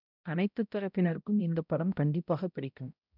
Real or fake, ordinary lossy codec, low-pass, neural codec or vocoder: fake; none; 5.4 kHz; codec, 16 kHz, 0.5 kbps, X-Codec, HuBERT features, trained on balanced general audio